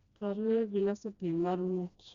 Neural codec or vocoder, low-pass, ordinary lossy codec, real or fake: codec, 16 kHz, 1 kbps, FreqCodec, smaller model; 7.2 kHz; Opus, 64 kbps; fake